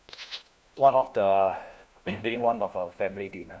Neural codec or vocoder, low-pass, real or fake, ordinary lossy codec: codec, 16 kHz, 1 kbps, FunCodec, trained on LibriTTS, 50 frames a second; none; fake; none